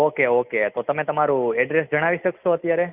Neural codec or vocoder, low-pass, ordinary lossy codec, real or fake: none; 3.6 kHz; none; real